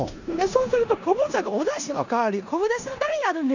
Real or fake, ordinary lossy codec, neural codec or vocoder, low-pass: fake; none; codec, 16 kHz in and 24 kHz out, 0.9 kbps, LongCat-Audio-Codec, four codebook decoder; 7.2 kHz